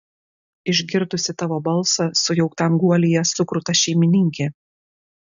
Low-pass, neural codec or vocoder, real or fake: 7.2 kHz; none; real